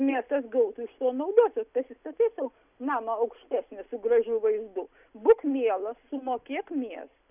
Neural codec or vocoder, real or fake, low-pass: none; real; 3.6 kHz